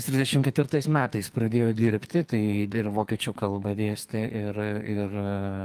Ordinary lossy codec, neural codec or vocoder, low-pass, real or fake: Opus, 32 kbps; codec, 44.1 kHz, 2.6 kbps, SNAC; 14.4 kHz; fake